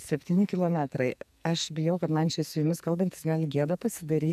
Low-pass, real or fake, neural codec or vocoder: 14.4 kHz; fake; codec, 44.1 kHz, 2.6 kbps, SNAC